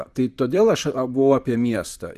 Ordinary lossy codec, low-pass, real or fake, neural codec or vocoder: Opus, 64 kbps; 14.4 kHz; fake; vocoder, 44.1 kHz, 128 mel bands, Pupu-Vocoder